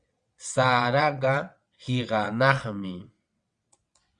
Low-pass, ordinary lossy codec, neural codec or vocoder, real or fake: 9.9 kHz; Opus, 64 kbps; vocoder, 22.05 kHz, 80 mel bands, WaveNeXt; fake